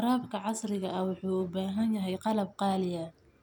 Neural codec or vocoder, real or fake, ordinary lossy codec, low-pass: none; real; none; none